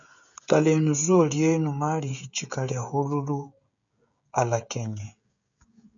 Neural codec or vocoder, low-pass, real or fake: codec, 16 kHz, 16 kbps, FreqCodec, smaller model; 7.2 kHz; fake